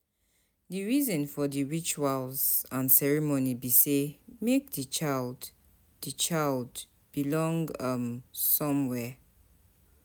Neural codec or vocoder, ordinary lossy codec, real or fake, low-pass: none; none; real; none